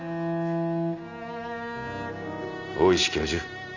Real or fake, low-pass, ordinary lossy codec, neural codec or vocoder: real; 7.2 kHz; none; none